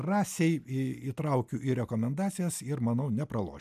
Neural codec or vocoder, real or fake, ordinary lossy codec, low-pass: none; real; AAC, 96 kbps; 14.4 kHz